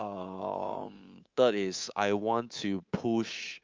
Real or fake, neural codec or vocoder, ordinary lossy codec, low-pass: fake; codec, 24 kHz, 3.1 kbps, DualCodec; Opus, 32 kbps; 7.2 kHz